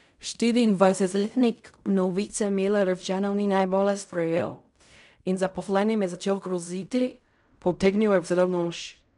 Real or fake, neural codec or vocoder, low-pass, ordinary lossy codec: fake; codec, 16 kHz in and 24 kHz out, 0.4 kbps, LongCat-Audio-Codec, fine tuned four codebook decoder; 10.8 kHz; none